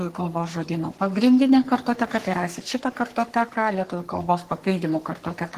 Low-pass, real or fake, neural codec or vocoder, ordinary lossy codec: 14.4 kHz; fake; codec, 44.1 kHz, 3.4 kbps, Pupu-Codec; Opus, 16 kbps